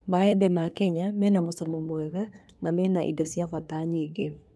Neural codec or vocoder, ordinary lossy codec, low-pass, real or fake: codec, 24 kHz, 1 kbps, SNAC; none; none; fake